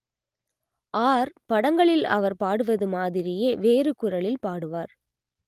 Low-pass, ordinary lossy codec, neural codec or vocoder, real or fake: 14.4 kHz; Opus, 24 kbps; none; real